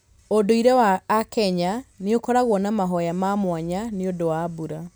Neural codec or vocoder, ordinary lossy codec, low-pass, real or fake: none; none; none; real